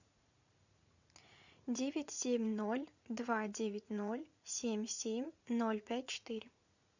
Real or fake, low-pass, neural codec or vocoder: fake; 7.2 kHz; vocoder, 44.1 kHz, 80 mel bands, Vocos